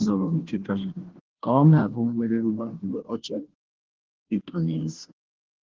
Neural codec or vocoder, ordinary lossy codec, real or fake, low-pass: codec, 16 kHz, 0.5 kbps, FunCodec, trained on Chinese and English, 25 frames a second; Opus, 16 kbps; fake; 7.2 kHz